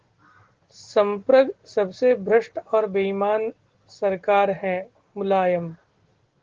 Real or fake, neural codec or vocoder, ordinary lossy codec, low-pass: real; none; Opus, 16 kbps; 7.2 kHz